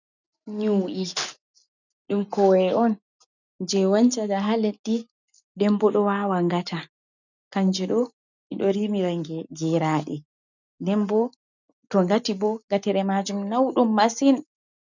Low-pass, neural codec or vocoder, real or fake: 7.2 kHz; none; real